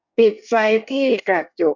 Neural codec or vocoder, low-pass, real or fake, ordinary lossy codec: codec, 24 kHz, 1 kbps, SNAC; 7.2 kHz; fake; none